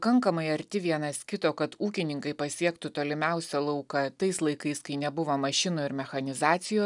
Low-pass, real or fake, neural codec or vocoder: 10.8 kHz; real; none